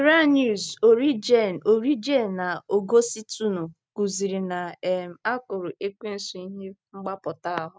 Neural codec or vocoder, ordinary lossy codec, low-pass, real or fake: none; none; none; real